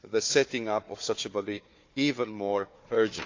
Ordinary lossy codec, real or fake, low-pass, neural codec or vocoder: AAC, 48 kbps; fake; 7.2 kHz; codec, 16 kHz, 4 kbps, FunCodec, trained on Chinese and English, 50 frames a second